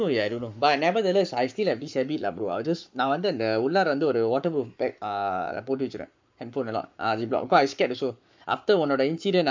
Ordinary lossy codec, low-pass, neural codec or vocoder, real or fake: MP3, 64 kbps; 7.2 kHz; codec, 44.1 kHz, 7.8 kbps, Pupu-Codec; fake